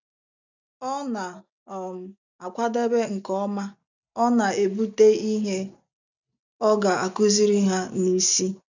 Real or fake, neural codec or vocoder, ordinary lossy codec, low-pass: real; none; none; 7.2 kHz